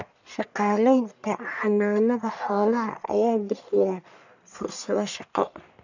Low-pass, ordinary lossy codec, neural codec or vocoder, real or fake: 7.2 kHz; none; codec, 44.1 kHz, 1.7 kbps, Pupu-Codec; fake